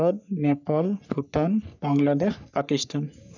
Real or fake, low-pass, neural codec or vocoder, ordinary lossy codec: fake; 7.2 kHz; codec, 44.1 kHz, 3.4 kbps, Pupu-Codec; none